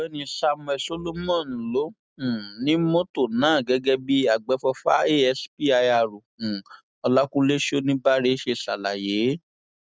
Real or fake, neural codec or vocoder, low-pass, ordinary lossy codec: real; none; none; none